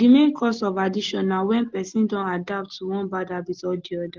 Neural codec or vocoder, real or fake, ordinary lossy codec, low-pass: none; real; Opus, 16 kbps; 7.2 kHz